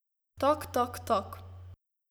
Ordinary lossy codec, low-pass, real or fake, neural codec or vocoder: none; none; real; none